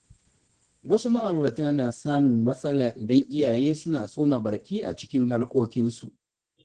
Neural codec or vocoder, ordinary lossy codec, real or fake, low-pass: codec, 24 kHz, 0.9 kbps, WavTokenizer, medium music audio release; Opus, 16 kbps; fake; 10.8 kHz